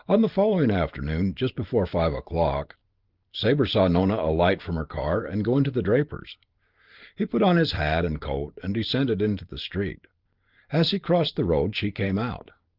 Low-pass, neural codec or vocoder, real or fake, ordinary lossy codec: 5.4 kHz; vocoder, 44.1 kHz, 128 mel bands every 512 samples, BigVGAN v2; fake; Opus, 32 kbps